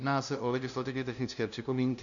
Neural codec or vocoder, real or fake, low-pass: codec, 16 kHz, 0.5 kbps, FunCodec, trained on LibriTTS, 25 frames a second; fake; 7.2 kHz